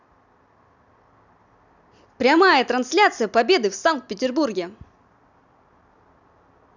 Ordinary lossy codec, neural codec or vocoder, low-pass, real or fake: none; none; 7.2 kHz; real